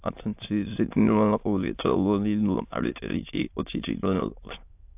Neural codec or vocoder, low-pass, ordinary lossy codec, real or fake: autoencoder, 22.05 kHz, a latent of 192 numbers a frame, VITS, trained on many speakers; 3.6 kHz; AAC, 32 kbps; fake